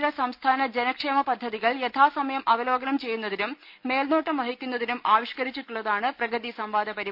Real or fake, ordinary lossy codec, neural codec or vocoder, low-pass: real; none; none; 5.4 kHz